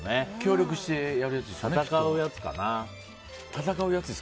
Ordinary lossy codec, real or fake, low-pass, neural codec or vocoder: none; real; none; none